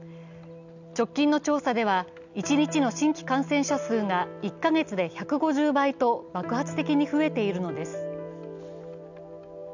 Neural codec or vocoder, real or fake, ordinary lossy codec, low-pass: none; real; none; 7.2 kHz